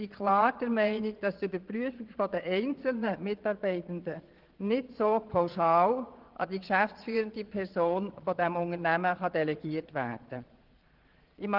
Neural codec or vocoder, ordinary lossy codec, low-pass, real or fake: vocoder, 22.05 kHz, 80 mel bands, Vocos; Opus, 16 kbps; 5.4 kHz; fake